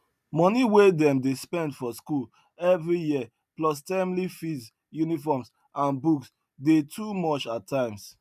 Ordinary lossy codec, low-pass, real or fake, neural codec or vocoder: none; 14.4 kHz; real; none